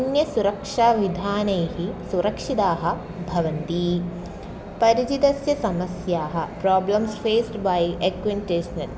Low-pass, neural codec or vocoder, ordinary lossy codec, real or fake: none; none; none; real